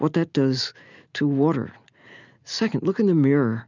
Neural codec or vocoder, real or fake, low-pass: none; real; 7.2 kHz